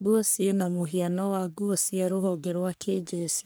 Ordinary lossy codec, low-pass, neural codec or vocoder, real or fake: none; none; codec, 44.1 kHz, 3.4 kbps, Pupu-Codec; fake